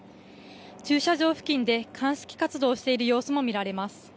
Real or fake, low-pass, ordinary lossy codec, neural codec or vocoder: real; none; none; none